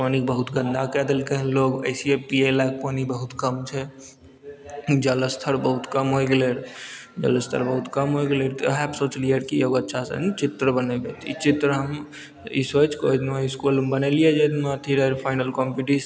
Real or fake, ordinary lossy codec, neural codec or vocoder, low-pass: real; none; none; none